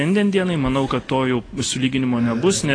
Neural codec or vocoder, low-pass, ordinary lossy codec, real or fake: none; 9.9 kHz; AAC, 32 kbps; real